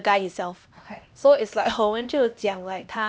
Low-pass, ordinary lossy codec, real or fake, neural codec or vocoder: none; none; fake; codec, 16 kHz, 1 kbps, X-Codec, HuBERT features, trained on LibriSpeech